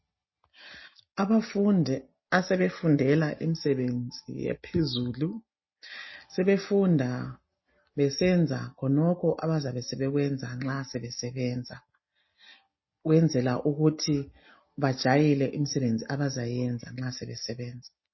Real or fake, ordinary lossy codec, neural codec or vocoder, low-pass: real; MP3, 24 kbps; none; 7.2 kHz